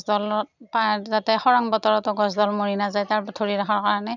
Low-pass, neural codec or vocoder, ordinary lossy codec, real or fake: 7.2 kHz; none; none; real